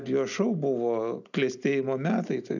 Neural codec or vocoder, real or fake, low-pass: none; real; 7.2 kHz